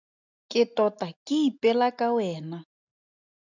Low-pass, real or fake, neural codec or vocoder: 7.2 kHz; real; none